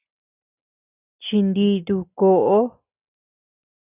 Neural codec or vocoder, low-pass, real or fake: none; 3.6 kHz; real